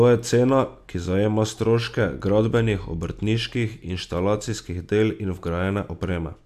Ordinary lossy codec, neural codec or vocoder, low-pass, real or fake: AAC, 96 kbps; none; 14.4 kHz; real